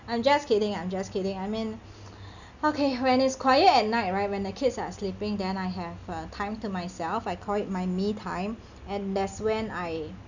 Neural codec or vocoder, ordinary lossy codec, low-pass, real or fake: none; none; 7.2 kHz; real